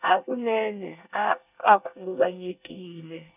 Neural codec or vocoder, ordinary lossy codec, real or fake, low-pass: codec, 24 kHz, 1 kbps, SNAC; none; fake; 3.6 kHz